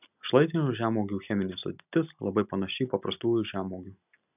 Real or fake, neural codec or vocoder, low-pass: real; none; 3.6 kHz